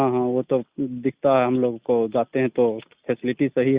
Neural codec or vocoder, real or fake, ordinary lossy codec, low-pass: none; real; Opus, 64 kbps; 3.6 kHz